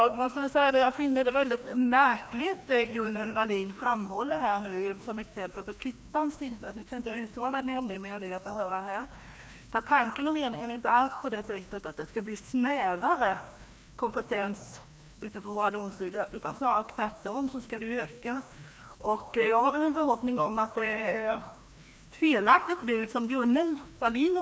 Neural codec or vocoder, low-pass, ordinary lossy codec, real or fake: codec, 16 kHz, 1 kbps, FreqCodec, larger model; none; none; fake